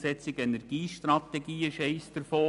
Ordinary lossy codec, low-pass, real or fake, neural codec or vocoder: none; 10.8 kHz; real; none